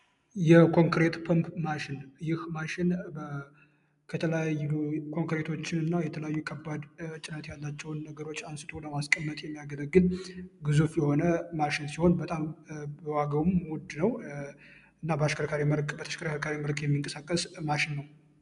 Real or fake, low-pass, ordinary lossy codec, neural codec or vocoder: real; 10.8 kHz; MP3, 96 kbps; none